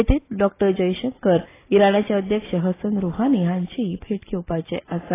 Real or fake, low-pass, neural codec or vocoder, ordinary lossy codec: real; 3.6 kHz; none; AAC, 16 kbps